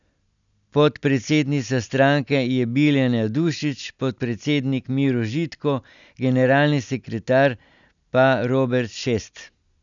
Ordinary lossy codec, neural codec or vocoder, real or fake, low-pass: none; none; real; 7.2 kHz